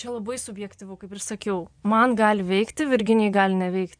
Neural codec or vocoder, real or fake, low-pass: vocoder, 24 kHz, 100 mel bands, Vocos; fake; 9.9 kHz